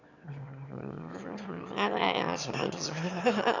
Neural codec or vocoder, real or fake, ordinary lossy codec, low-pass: autoencoder, 22.05 kHz, a latent of 192 numbers a frame, VITS, trained on one speaker; fake; none; 7.2 kHz